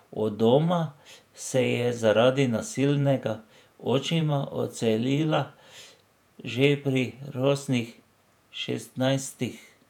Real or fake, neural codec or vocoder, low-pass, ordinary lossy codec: fake; vocoder, 48 kHz, 128 mel bands, Vocos; 19.8 kHz; none